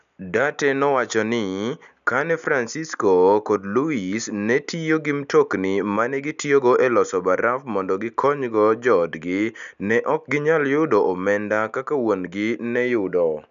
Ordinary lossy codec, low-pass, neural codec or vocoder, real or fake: none; 7.2 kHz; none; real